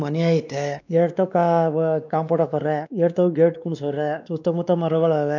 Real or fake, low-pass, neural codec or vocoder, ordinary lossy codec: fake; 7.2 kHz; codec, 16 kHz, 2 kbps, X-Codec, WavLM features, trained on Multilingual LibriSpeech; none